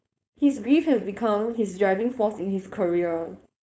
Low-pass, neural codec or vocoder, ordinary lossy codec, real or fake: none; codec, 16 kHz, 4.8 kbps, FACodec; none; fake